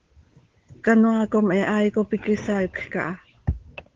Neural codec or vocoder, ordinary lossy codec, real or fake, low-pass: codec, 16 kHz, 8 kbps, FunCodec, trained on Chinese and English, 25 frames a second; Opus, 16 kbps; fake; 7.2 kHz